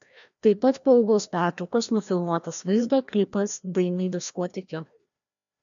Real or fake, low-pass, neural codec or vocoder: fake; 7.2 kHz; codec, 16 kHz, 1 kbps, FreqCodec, larger model